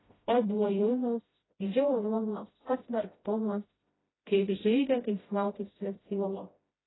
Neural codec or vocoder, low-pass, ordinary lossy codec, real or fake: codec, 16 kHz, 0.5 kbps, FreqCodec, smaller model; 7.2 kHz; AAC, 16 kbps; fake